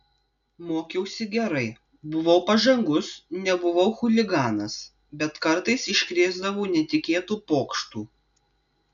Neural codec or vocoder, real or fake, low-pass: none; real; 7.2 kHz